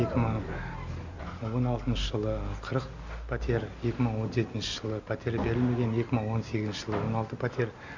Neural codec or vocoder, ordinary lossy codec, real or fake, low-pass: none; none; real; 7.2 kHz